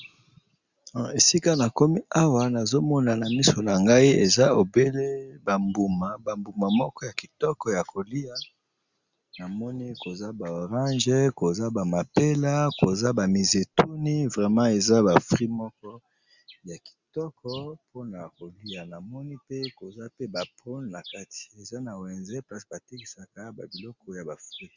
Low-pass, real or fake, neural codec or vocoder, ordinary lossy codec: 7.2 kHz; real; none; Opus, 64 kbps